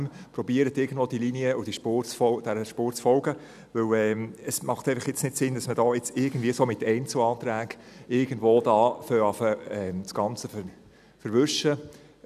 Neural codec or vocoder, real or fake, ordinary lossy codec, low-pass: none; real; none; 14.4 kHz